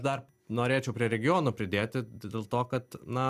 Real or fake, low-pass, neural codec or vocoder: real; 14.4 kHz; none